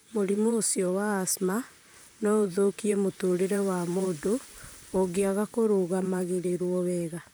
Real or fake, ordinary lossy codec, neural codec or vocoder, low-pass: fake; none; vocoder, 44.1 kHz, 128 mel bands, Pupu-Vocoder; none